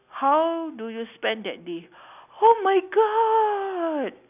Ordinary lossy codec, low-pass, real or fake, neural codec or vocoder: none; 3.6 kHz; real; none